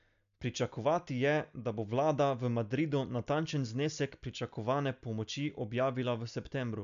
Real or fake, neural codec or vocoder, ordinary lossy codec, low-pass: real; none; none; 7.2 kHz